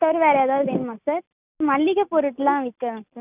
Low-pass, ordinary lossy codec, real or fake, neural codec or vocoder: 3.6 kHz; none; real; none